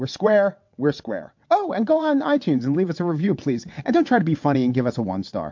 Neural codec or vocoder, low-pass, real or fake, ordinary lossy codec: vocoder, 22.05 kHz, 80 mel bands, WaveNeXt; 7.2 kHz; fake; MP3, 48 kbps